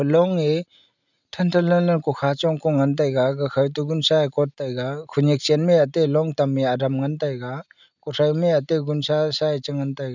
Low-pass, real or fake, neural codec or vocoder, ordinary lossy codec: 7.2 kHz; real; none; none